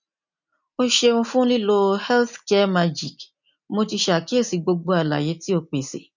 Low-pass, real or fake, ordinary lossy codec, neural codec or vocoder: 7.2 kHz; real; none; none